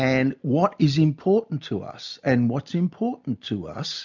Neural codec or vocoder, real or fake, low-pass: none; real; 7.2 kHz